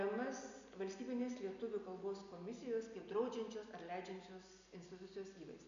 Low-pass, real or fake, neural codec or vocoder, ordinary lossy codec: 7.2 kHz; real; none; AAC, 96 kbps